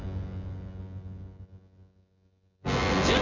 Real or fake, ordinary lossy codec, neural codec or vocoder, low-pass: fake; none; vocoder, 24 kHz, 100 mel bands, Vocos; 7.2 kHz